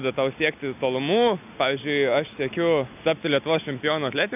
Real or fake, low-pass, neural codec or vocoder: real; 3.6 kHz; none